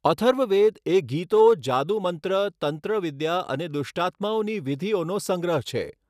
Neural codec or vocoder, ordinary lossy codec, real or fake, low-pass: none; none; real; 14.4 kHz